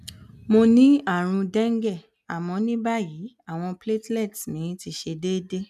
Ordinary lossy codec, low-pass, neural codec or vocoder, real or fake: none; 14.4 kHz; none; real